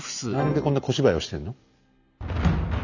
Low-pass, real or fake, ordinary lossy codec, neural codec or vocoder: 7.2 kHz; real; none; none